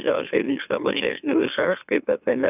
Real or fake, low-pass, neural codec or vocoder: fake; 3.6 kHz; autoencoder, 44.1 kHz, a latent of 192 numbers a frame, MeloTTS